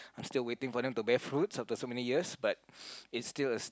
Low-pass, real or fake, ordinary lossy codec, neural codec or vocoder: none; real; none; none